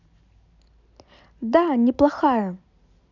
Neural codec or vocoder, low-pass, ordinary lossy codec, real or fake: none; 7.2 kHz; none; real